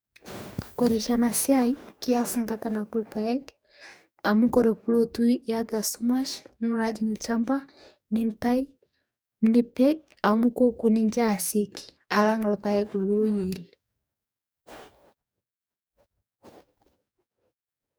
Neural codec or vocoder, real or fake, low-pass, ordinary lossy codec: codec, 44.1 kHz, 2.6 kbps, DAC; fake; none; none